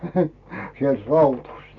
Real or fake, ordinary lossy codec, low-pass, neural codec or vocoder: real; none; 7.2 kHz; none